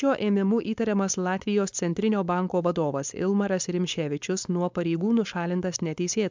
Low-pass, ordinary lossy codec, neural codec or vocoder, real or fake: 7.2 kHz; MP3, 64 kbps; codec, 16 kHz, 4.8 kbps, FACodec; fake